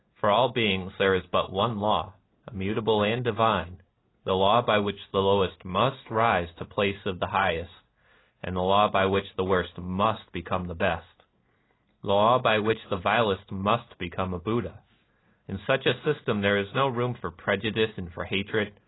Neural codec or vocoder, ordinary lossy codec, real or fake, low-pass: none; AAC, 16 kbps; real; 7.2 kHz